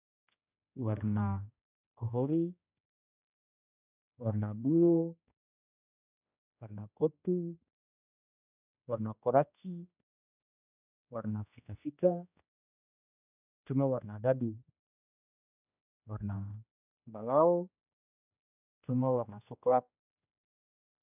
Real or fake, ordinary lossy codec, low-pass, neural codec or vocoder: fake; none; 3.6 kHz; codec, 16 kHz, 1 kbps, X-Codec, HuBERT features, trained on general audio